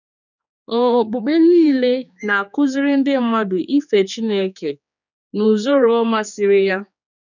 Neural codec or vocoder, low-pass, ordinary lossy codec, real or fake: codec, 16 kHz, 4 kbps, X-Codec, HuBERT features, trained on general audio; 7.2 kHz; none; fake